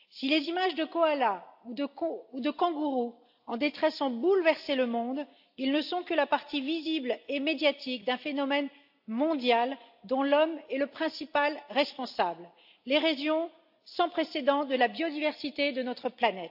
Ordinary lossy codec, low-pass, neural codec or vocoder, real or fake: AAC, 48 kbps; 5.4 kHz; none; real